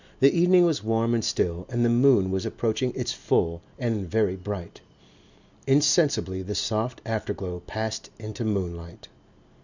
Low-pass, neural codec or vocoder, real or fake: 7.2 kHz; none; real